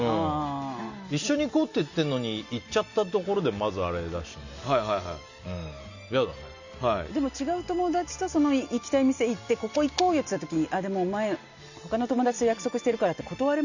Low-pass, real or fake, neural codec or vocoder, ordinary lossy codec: 7.2 kHz; real; none; Opus, 64 kbps